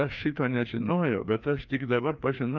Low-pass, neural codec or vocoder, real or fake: 7.2 kHz; codec, 16 kHz, 2 kbps, FreqCodec, larger model; fake